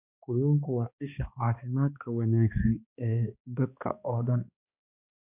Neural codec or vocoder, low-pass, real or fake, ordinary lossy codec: codec, 16 kHz, 2 kbps, X-Codec, HuBERT features, trained on balanced general audio; 3.6 kHz; fake; none